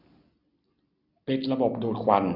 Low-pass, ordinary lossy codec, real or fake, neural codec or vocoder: 5.4 kHz; Opus, 64 kbps; real; none